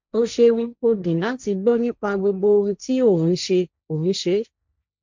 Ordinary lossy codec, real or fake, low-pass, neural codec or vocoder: MP3, 64 kbps; fake; 7.2 kHz; codec, 16 kHz, 1.1 kbps, Voila-Tokenizer